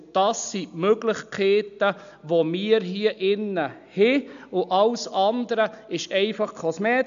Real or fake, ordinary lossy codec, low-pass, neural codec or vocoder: real; MP3, 96 kbps; 7.2 kHz; none